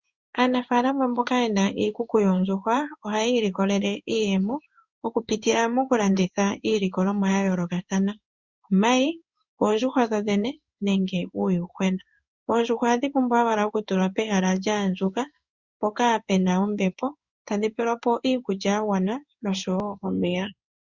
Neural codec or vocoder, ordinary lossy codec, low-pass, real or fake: codec, 16 kHz in and 24 kHz out, 1 kbps, XY-Tokenizer; Opus, 64 kbps; 7.2 kHz; fake